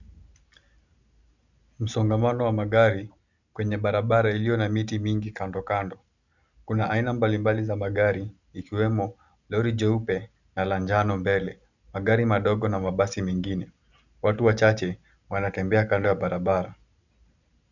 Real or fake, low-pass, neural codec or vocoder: real; 7.2 kHz; none